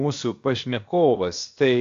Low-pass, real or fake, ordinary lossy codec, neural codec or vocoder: 7.2 kHz; fake; AAC, 48 kbps; codec, 16 kHz, 0.7 kbps, FocalCodec